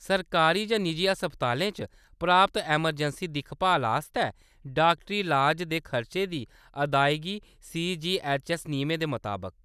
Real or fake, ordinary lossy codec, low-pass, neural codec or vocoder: real; none; 14.4 kHz; none